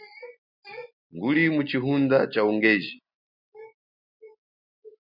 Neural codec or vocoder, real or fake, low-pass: none; real; 5.4 kHz